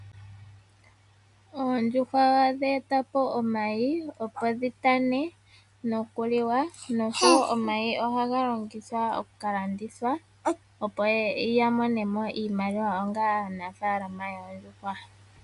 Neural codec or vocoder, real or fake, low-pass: none; real; 10.8 kHz